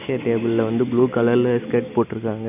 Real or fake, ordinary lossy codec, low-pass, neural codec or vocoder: real; none; 3.6 kHz; none